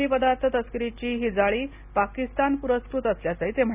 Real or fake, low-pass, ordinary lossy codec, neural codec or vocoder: real; 3.6 kHz; none; none